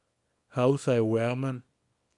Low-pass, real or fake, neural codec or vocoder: 10.8 kHz; fake; codec, 24 kHz, 0.9 kbps, WavTokenizer, small release